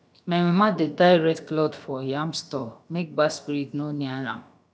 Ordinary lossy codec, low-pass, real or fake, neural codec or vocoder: none; none; fake; codec, 16 kHz, about 1 kbps, DyCAST, with the encoder's durations